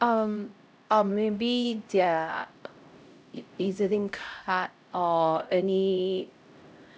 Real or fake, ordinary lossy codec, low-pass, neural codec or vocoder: fake; none; none; codec, 16 kHz, 0.5 kbps, X-Codec, HuBERT features, trained on LibriSpeech